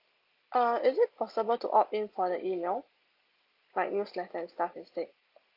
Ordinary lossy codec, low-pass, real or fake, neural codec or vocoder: Opus, 24 kbps; 5.4 kHz; real; none